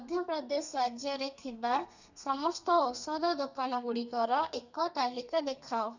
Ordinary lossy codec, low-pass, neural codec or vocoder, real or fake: none; 7.2 kHz; codec, 32 kHz, 1.9 kbps, SNAC; fake